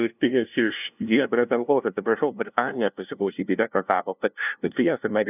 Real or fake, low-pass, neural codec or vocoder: fake; 3.6 kHz; codec, 16 kHz, 0.5 kbps, FunCodec, trained on LibriTTS, 25 frames a second